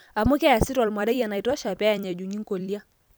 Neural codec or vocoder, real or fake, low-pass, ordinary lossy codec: vocoder, 44.1 kHz, 128 mel bands every 256 samples, BigVGAN v2; fake; none; none